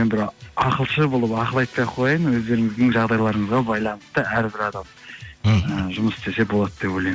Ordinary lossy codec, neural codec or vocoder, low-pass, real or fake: none; none; none; real